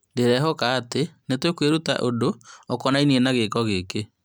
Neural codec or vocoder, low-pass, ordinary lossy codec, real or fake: none; none; none; real